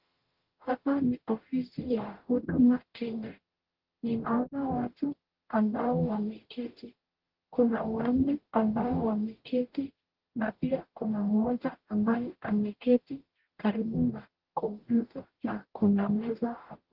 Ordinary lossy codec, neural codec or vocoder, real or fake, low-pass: Opus, 16 kbps; codec, 44.1 kHz, 0.9 kbps, DAC; fake; 5.4 kHz